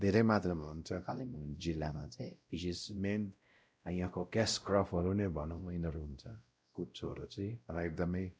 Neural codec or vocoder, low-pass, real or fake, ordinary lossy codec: codec, 16 kHz, 0.5 kbps, X-Codec, WavLM features, trained on Multilingual LibriSpeech; none; fake; none